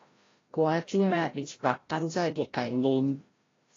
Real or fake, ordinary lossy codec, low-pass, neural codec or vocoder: fake; AAC, 32 kbps; 7.2 kHz; codec, 16 kHz, 0.5 kbps, FreqCodec, larger model